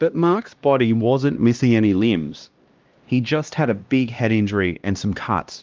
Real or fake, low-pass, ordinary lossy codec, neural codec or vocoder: fake; 7.2 kHz; Opus, 32 kbps; codec, 16 kHz, 1 kbps, X-Codec, HuBERT features, trained on LibriSpeech